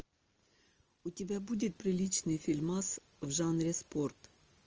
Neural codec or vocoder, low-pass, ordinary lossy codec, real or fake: none; 7.2 kHz; Opus, 24 kbps; real